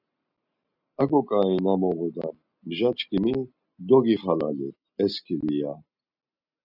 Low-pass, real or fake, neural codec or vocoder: 5.4 kHz; real; none